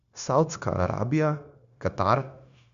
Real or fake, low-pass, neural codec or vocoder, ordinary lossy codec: fake; 7.2 kHz; codec, 16 kHz, 0.9 kbps, LongCat-Audio-Codec; Opus, 64 kbps